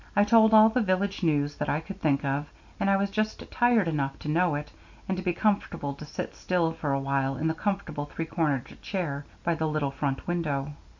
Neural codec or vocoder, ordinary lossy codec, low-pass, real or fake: none; MP3, 48 kbps; 7.2 kHz; real